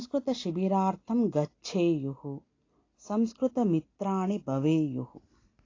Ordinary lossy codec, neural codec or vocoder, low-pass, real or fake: AAC, 32 kbps; none; 7.2 kHz; real